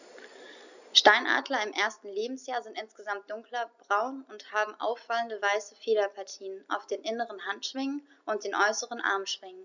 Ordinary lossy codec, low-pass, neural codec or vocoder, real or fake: none; 7.2 kHz; none; real